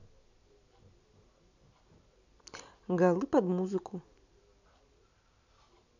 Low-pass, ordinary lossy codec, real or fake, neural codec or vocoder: 7.2 kHz; none; real; none